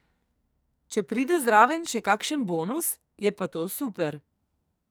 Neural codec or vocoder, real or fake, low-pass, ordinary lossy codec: codec, 44.1 kHz, 2.6 kbps, SNAC; fake; none; none